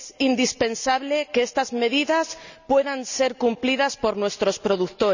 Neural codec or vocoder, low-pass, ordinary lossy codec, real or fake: none; 7.2 kHz; none; real